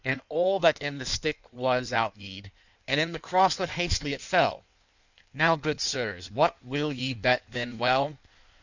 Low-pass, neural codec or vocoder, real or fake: 7.2 kHz; codec, 16 kHz in and 24 kHz out, 1.1 kbps, FireRedTTS-2 codec; fake